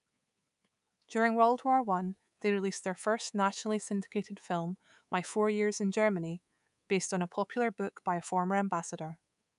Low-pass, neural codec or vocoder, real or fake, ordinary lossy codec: 10.8 kHz; codec, 24 kHz, 3.1 kbps, DualCodec; fake; none